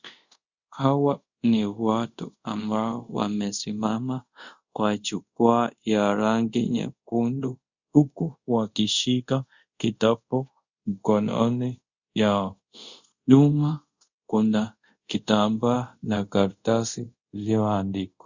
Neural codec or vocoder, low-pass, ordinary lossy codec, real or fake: codec, 24 kHz, 0.5 kbps, DualCodec; 7.2 kHz; Opus, 64 kbps; fake